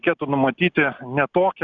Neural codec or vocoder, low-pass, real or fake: none; 7.2 kHz; real